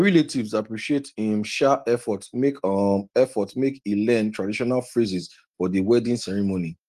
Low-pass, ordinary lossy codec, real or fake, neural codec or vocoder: 14.4 kHz; Opus, 24 kbps; real; none